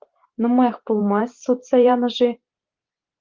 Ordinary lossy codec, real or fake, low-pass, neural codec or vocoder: Opus, 32 kbps; fake; 7.2 kHz; vocoder, 44.1 kHz, 128 mel bands every 512 samples, BigVGAN v2